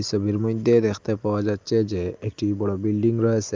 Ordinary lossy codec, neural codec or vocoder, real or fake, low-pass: Opus, 24 kbps; none; real; 7.2 kHz